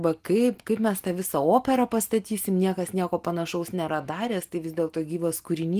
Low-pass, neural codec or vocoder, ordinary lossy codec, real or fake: 14.4 kHz; none; Opus, 24 kbps; real